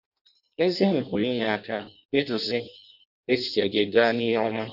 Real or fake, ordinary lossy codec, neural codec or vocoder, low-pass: fake; none; codec, 16 kHz in and 24 kHz out, 0.6 kbps, FireRedTTS-2 codec; 5.4 kHz